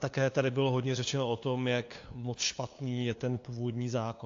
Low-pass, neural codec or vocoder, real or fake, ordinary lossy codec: 7.2 kHz; codec, 16 kHz, 2 kbps, FunCodec, trained on LibriTTS, 25 frames a second; fake; AAC, 48 kbps